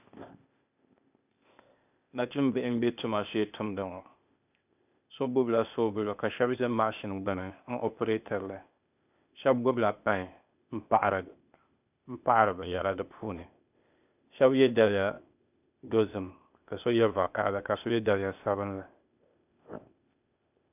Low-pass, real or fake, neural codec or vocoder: 3.6 kHz; fake; codec, 16 kHz, 0.8 kbps, ZipCodec